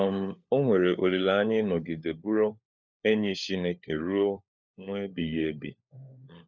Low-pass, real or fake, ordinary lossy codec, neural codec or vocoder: 7.2 kHz; fake; none; codec, 16 kHz, 4 kbps, FunCodec, trained on LibriTTS, 50 frames a second